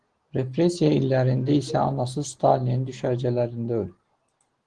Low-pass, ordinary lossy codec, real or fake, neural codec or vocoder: 9.9 kHz; Opus, 16 kbps; real; none